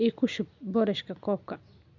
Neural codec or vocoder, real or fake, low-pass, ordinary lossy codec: none; real; 7.2 kHz; none